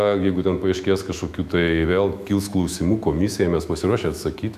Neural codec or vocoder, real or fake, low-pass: autoencoder, 48 kHz, 128 numbers a frame, DAC-VAE, trained on Japanese speech; fake; 14.4 kHz